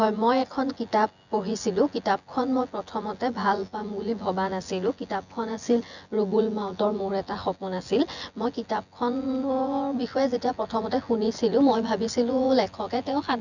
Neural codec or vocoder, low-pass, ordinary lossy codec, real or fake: vocoder, 24 kHz, 100 mel bands, Vocos; 7.2 kHz; none; fake